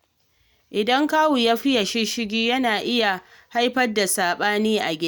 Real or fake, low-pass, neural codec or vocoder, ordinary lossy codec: real; none; none; none